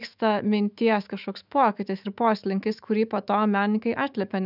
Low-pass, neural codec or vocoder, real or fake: 5.4 kHz; autoencoder, 48 kHz, 128 numbers a frame, DAC-VAE, trained on Japanese speech; fake